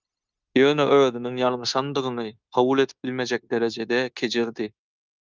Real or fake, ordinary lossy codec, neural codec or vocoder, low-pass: fake; Opus, 24 kbps; codec, 16 kHz, 0.9 kbps, LongCat-Audio-Codec; 7.2 kHz